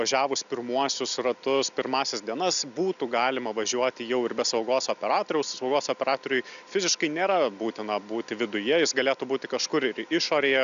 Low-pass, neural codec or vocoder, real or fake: 7.2 kHz; none; real